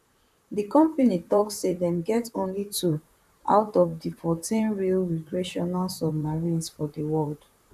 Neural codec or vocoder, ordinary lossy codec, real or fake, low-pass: vocoder, 44.1 kHz, 128 mel bands, Pupu-Vocoder; none; fake; 14.4 kHz